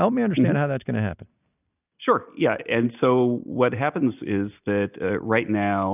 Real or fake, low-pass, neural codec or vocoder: real; 3.6 kHz; none